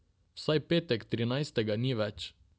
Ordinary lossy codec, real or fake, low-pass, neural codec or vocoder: none; real; none; none